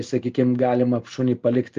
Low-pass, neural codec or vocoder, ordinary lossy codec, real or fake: 7.2 kHz; none; Opus, 32 kbps; real